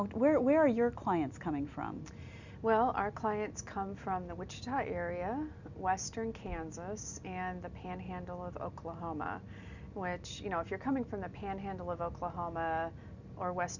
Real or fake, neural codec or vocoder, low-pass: real; none; 7.2 kHz